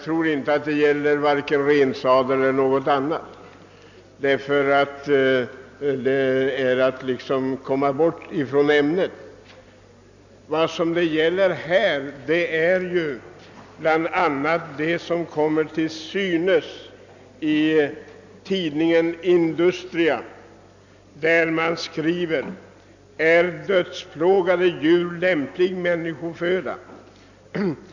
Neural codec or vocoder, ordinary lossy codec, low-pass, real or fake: none; none; 7.2 kHz; real